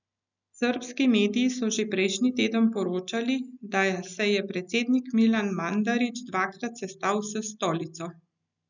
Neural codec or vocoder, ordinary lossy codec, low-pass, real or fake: none; none; 7.2 kHz; real